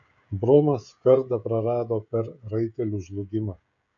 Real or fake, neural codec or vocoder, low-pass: fake; codec, 16 kHz, 16 kbps, FreqCodec, smaller model; 7.2 kHz